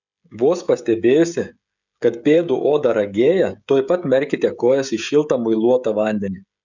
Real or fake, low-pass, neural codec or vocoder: fake; 7.2 kHz; codec, 16 kHz, 16 kbps, FreqCodec, smaller model